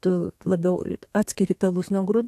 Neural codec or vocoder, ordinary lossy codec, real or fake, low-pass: codec, 32 kHz, 1.9 kbps, SNAC; AAC, 64 kbps; fake; 14.4 kHz